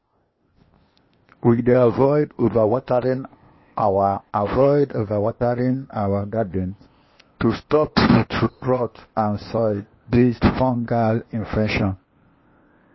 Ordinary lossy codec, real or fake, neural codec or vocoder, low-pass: MP3, 24 kbps; fake; codec, 16 kHz, 0.8 kbps, ZipCodec; 7.2 kHz